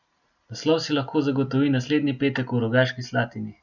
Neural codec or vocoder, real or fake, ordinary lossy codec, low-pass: none; real; none; 7.2 kHz